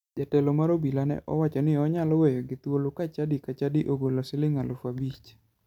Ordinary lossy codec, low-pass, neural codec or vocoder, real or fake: none; 19.8 kHz; none; real